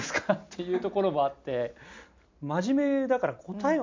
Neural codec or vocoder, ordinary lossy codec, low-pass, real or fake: none; MP3, 64 kbps; 7.2 kHz; real